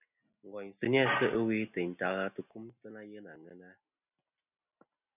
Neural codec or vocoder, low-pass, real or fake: none; 3.6 kHz; real